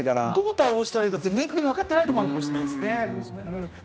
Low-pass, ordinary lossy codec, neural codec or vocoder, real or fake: none; none; codec, 16 kHz, 1 kbps, X-Codec, HuBERT features, trained on general audio; fake